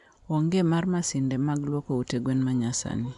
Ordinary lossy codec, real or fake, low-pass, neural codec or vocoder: none; real; 10.8 kHz; none